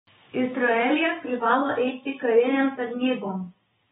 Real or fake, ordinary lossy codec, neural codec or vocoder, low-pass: fake; AAC, 16 kbps; codec, 16 kHz, 6 kbps, DAC; 7.2 kHz